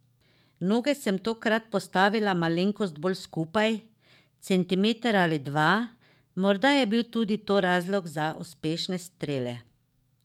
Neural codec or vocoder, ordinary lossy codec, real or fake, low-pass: codec, 44.1 kHz, 7.8 kbps, DAC; MP3, 96 kbps; fake; 19.8 kHz